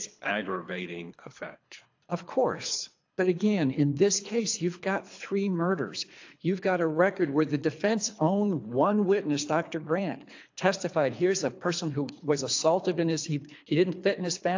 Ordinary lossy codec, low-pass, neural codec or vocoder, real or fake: AAC, 48 kbps; 7.2 kHz; codec, 24 kHz, 3 kbps, HILCodec; fake